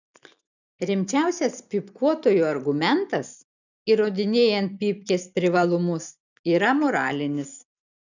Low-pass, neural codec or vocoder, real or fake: 7.2 kHz; none; real